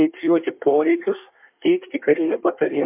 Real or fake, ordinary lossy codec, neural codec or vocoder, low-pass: fake; MP3, 32 kbps; codec, 24 kHz, 1 kbps, SNAC; 3.6 kHz